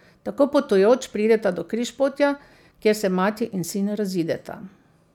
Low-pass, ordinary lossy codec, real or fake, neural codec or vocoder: 19.8 kHz; none; real; none